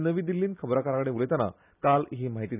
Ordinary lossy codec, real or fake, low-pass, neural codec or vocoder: none; real; 3.6 kHz; none